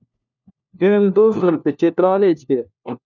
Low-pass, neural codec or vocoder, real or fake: 7.2 kHz; codec, 16 kHz, 1 kbps, FunCodec, trained on LibriTTS, 50 frames a second; fake